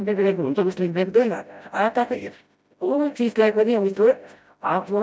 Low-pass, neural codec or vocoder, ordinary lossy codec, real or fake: none; codec, 16 kHz, 0.5 kbps, FreqCodec, smaller model; none; fake